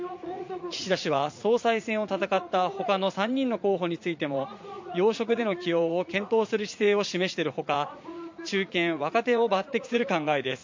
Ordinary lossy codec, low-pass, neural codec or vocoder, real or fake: MP3, 48 kbps; 7.2 kHz; vocoder, 44.1 kHz, 80 mel bands, Vocos; fake